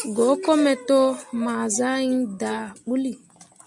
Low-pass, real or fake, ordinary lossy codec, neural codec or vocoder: 10.8 kHz; real; MP3, 64 kbps; none